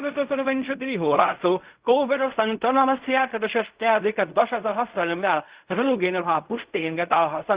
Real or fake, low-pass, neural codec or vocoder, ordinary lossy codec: fake; 3.6 kHz; codec, 16 kHz in and 24 kHz out, 0.4 kbps, LongCat-Audio-Codec, fine tuned four codebook decoder; Opus, 64 kbps